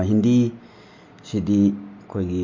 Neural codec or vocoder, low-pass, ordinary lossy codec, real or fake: none; 7.2 kHz; MP3, 48 kbps; real